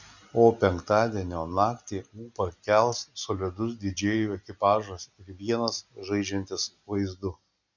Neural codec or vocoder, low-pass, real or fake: none; 7.2 kHz; real